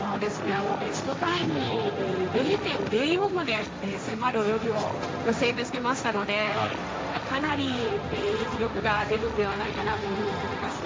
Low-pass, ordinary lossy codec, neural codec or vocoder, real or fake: none; none; codec, 16 kHz, 1.1 kbps, Voila-Tokenizer; fake